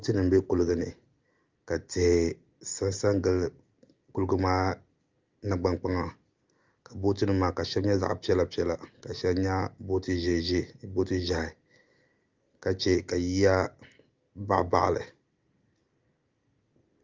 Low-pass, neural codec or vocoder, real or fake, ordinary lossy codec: 7.2 kHz; none; real; Opus, 32 kbps